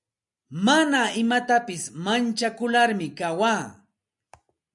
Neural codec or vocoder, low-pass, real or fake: none; 10.8 kHz; real